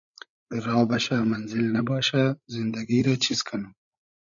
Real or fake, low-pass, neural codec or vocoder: fake; 7.2 kHz; codec, 16 kHz, 16 kbps, FreqCodec, larger model